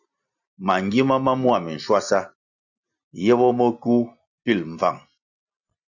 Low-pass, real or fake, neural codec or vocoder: 7.2 kHz; real; none